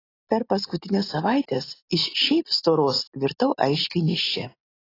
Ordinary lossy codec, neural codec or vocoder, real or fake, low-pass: AAC, 24 kbps; none; real; 5.4 kHz